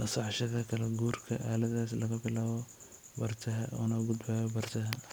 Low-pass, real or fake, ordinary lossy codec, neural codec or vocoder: none; fake; none; vocoder, 44.1 kHz, 128 mel bands every 256 samples, BigVGAN v2